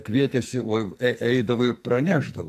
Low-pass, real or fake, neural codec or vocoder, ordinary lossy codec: 14.4 kHz; fake; codec, 44.1 kHz, 2.6 kbps, SNAC; AAC, 96 kbps